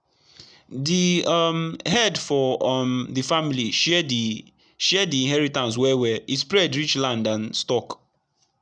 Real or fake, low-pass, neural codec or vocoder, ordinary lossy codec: real; 9.9 kHz; none; none